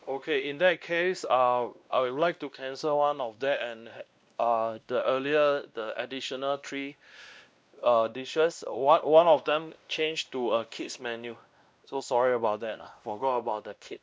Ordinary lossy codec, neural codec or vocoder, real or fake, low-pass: none; codec, 16 kHz, 1 kbps, X-Codec, WavLM features, trained on Multilingual LibriSpeech; fake; none